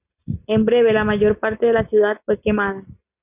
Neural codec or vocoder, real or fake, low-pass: none; real; 3.6 kHz